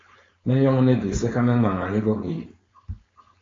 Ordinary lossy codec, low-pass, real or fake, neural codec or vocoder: AAC, 32 kbps; 7.2 kHz; fake; codec, 16 kHz, 4.8 kbps, FACodec